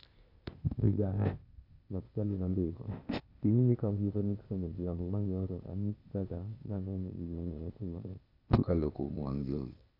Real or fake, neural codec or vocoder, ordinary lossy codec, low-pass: fake; codec, 16 kHz, 0.8 kbps, ZipCodec; none; 5.4 kHz